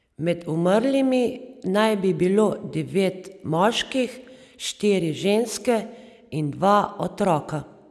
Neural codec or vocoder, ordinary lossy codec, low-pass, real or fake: none; none; none; real